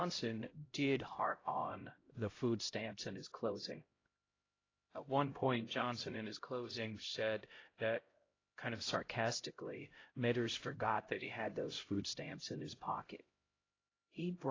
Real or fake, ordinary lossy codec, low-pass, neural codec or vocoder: fake; AAC, 32 kbps; 7.2 kHz; codec, 16 kHz, 0.5 kbps, X-Codec, HuBERT features, trained on LibriSpeech